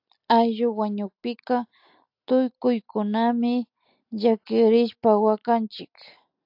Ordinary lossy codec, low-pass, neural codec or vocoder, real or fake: AAC, 48 kbps; 5.4 kHz; none; real